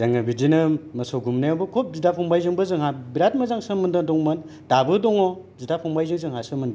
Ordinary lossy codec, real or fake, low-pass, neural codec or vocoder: none; real; none; none